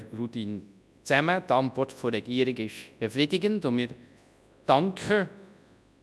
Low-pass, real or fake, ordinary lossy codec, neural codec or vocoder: none; fake; none; codec, 24 kHz, 0.9 kbps, WavTokenizer, large speech release